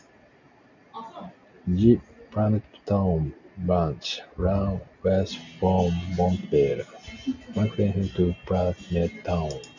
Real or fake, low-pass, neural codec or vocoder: real; 7.2 kHz; none